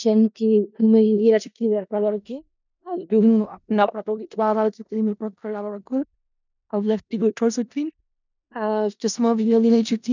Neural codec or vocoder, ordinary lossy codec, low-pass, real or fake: codec, 16 kHz in and 24 kHz out, 0.4 kbps, LongCat-Audio-Codec, four codebook decoder; none; 7.2 kHz; fake